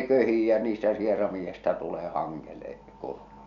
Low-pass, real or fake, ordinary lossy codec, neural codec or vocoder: 7.2 kHz; real; none; none